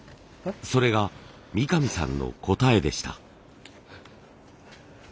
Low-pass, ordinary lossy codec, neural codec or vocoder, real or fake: none; none; none; real